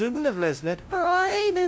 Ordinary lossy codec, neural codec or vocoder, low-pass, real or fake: none; codec, 16 kHz, 0.5 kbps, FunCodec, trained on LibriTTS, 25 frames a second; none; fake